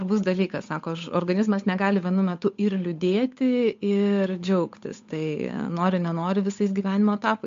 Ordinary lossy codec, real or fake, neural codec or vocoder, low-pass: MP3, 48 kbps; fake; codec, 16 kHz, 8 kbps, FunCodec, trained on Chinese and English, 25 frames a second; 7.2 kHz